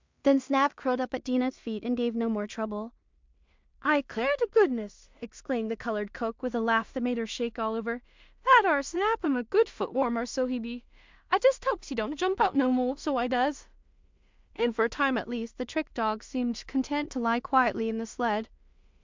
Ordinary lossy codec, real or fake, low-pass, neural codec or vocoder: MP3, 64 kbps; fake; 7.2 kHz; codec, 16 kHz in and 24 kHz out, 0.4 kbps, LongCat-Audio-Codec, two codebook decoder